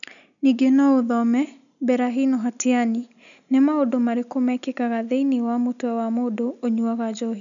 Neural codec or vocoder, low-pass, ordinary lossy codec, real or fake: none; 7.2 kHz; none; real